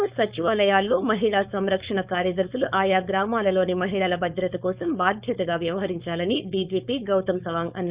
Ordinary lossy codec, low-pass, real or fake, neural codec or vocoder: Opus, 64 kbps; 3.6 kHz; fake; codec, 16 kHz, 4.8 kbps, FACodec